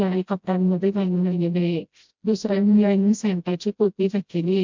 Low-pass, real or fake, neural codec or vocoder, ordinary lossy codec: 7.2 kHz; fake; codec, 16 kHz, 0.5 kbps, FreqCodec, smaller model; MP3, 64 kbps